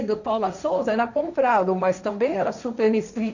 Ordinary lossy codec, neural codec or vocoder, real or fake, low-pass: none; codec, 16 kHz, 1.1 kbps, Voila-Tokenizer; fake; 7.2 kHz